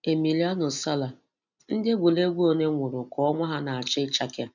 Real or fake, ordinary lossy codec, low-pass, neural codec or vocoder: real; none; 7.2 kHz; none